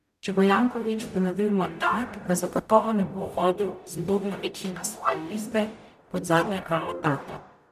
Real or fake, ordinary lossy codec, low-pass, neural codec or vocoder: fake; none; 14.4 kHz; codec, 44.1 kHz, 0.9 kbps, DAC